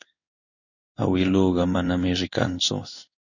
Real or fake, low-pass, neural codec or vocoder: fake; 7.2 kHz; codec, 16 kHz in and 24 kHz out, 1 kbps, XY-Tokenizer